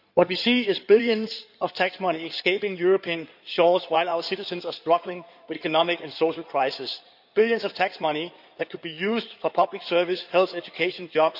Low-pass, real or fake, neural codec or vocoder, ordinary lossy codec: 5.4 kHz; fake; codec, 16 kHz in and 24 kHz out, 2.2 kbps, FireRedTTS-2 codec; none